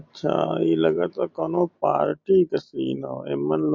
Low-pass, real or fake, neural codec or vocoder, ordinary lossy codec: 7.2 kHz; real; none; MP3, 48 kbps